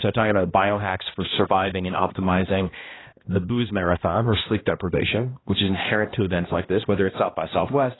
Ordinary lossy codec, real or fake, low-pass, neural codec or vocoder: AAC, 16 kbps; fake; 7.2 kHz; codec, 16 kHz, 1 kbps, X-Codec, HuBERT features, trained on balanced general audio